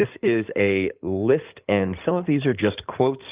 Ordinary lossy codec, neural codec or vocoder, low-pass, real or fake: Opus, 32 kbps; codec, 16 kHz in and 24 kHz out, 2.2 kbps, FireRedTTS-2 codec; 3.6 kHz; fake